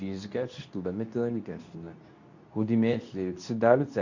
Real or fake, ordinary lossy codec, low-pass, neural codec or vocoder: fake; none; 7.2 kHz; codec, 24 kHz, 0.9 kbps, WavTokenizer, medium speech release version 2